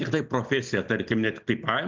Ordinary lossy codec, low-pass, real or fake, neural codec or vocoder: Opus, 32 kbps; 7.2 kHz; real; none